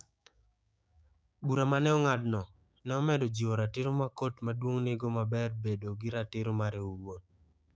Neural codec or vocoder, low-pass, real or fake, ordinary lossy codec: codec, 16 kHz, 6 kbps, DAC; none; fake; none